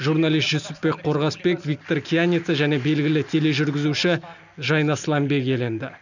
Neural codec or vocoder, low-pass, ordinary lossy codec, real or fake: none; 7.2 kHz; none; real